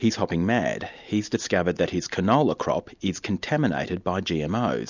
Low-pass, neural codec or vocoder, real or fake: 7.2 kHz; none; real